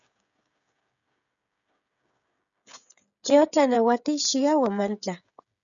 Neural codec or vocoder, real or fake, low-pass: codec, 16 kHz, 16 kbps, FreqCodec, smaller model; fake; 7.2 kHz